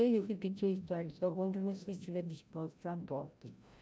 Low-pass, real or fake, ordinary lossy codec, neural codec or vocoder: none; fake; none; codec, 16 kHz, 0.5 kbps, FreqCodec, larger model